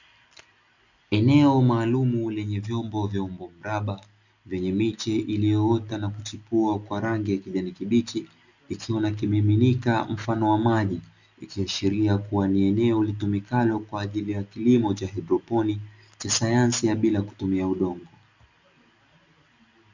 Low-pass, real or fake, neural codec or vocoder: 7.2 kHz; real; none